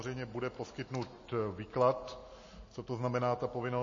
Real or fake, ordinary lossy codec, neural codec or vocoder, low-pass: real; MP3, 32 kbps; none; 7.2 kHz